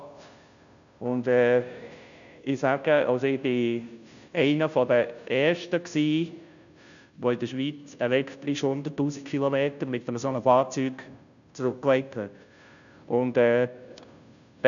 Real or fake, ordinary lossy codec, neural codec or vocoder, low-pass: fake; none; codec, 16 kHz, 0.5 kbps, FunCodec, trained on Chinese and English, 25 frames a second; 7.2 kHz